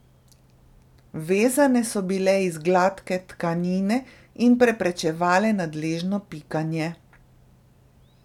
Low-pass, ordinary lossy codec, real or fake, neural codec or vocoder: 19.8 kHz; none; real; none